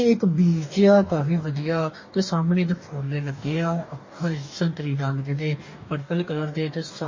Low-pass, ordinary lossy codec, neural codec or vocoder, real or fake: 7.2 kHz; MP3, 32 kbps; codec, 44.1 kHz, 2.6 kbps, DAC; fake